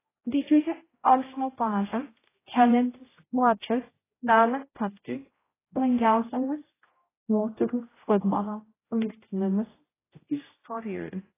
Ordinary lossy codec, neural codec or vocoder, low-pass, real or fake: AAC, 16 kbps; codec, 16 kHz, 0.5 kbps, X-Codec, HuBERT features, trained on general audio; 3.6 kHz; fake